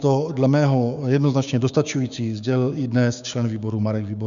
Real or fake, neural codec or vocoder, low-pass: fake; codec, 16 kHz, 6 kbps, DAC; 7.2 kHz